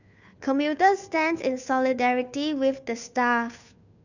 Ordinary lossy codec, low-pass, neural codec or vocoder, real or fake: none; 7.2 kHz; codec, 16 kHz, 2 kbps, FunCodec, trained on Chinese and English, 25 frames a second; fake